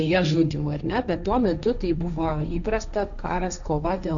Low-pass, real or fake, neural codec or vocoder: 7.2 kHz; fake; codec, 16 kHz, 1.1 kbps, Voila-Tokenizer